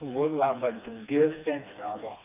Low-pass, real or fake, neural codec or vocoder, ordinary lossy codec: 3.6 kHz; fake; codec, 16 kHz, 2 kbps, FreqCodec, smaller model; none